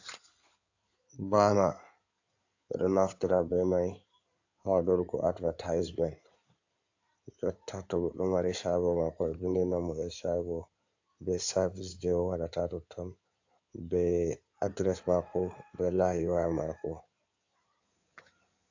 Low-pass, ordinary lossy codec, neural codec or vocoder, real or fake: 7.2 kHz; AAC, 48 kbps; codec, 16 kHz in and 24 kHz out, 2.2 kbps, FireRedTTS-2 codec; fake